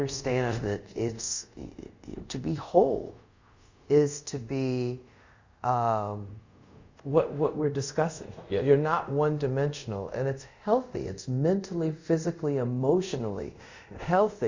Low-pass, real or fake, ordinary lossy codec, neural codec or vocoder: 7.2 kHz; fake; Opus, 64 kbps; codec, 24 kHz, 0.5 kbps, DualCodec